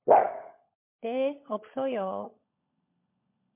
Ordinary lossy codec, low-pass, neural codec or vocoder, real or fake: MP3, 24 kbps; 3.6 kHz; codec, 16 kHz, 16 kbps, FunCodec, trained on LibriTTS, 50 frames a second; fake